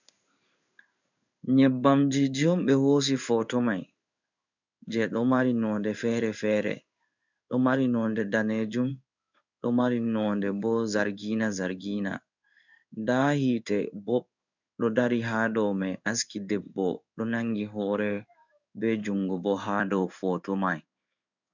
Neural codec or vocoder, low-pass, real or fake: codec, 16 kHz in and 24 kHz out, 1 kbps, XY-Tokenizer; 7.2 kHz; fake